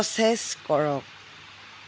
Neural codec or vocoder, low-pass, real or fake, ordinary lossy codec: none; none; real; none